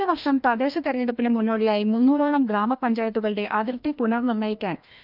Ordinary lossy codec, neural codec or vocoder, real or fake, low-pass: none; codec, 16 kHz, 1 kbps, FreqCodec, larger model; fake; 5.4 kHz